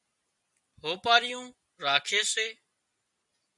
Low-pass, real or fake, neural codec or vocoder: 10.8 kHz; real; none